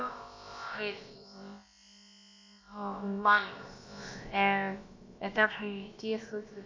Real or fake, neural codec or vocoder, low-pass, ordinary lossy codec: fake; codec, 16 kHz, about 1 kbps, DyCAST, with the encoder's durations; 7.2 kHz; MP3, 64 kbps